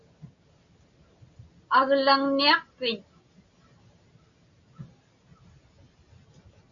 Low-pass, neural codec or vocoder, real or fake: 7.2 kHz; none; real